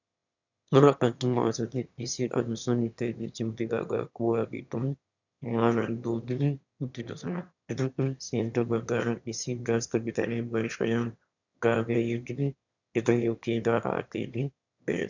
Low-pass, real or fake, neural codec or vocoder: 7.2 kHz; fake; autoencoder, 22.05 kHz, a latent of 192 numbers a frame, VITS, trained on one speaker